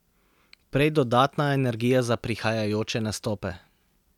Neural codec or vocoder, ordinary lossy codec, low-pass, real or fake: none; none; 19.8 kHz; real